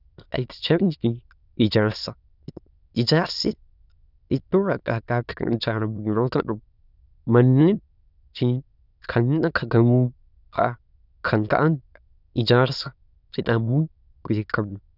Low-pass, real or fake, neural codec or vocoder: 5.4 kHz; fake; autoencoder, 22.05 kHz, a latent of 192 numbers a frame, VITS, trained on many speakers